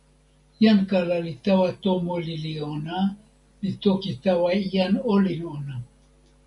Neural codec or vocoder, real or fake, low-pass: none; real; 10.8 kHz